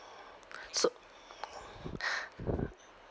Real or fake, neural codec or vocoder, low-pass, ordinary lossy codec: real; none; none; none